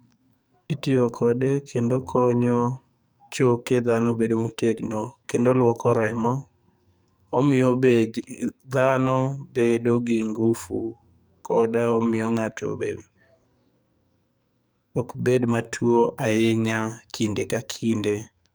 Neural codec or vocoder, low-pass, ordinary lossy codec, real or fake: codec, 44.1 kHz, 2.6 kbps, SNAC; none; none; fake